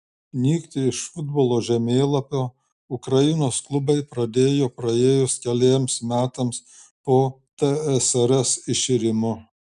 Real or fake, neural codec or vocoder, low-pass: real; none; 10.8 kHz